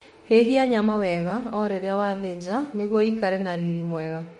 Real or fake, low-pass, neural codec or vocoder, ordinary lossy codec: fake; 19.8 kHz; autoencoder, 48 kHz, 32 numbers a frame, DAC-VAE, trained on Japanese speech; MP3, 48 kbps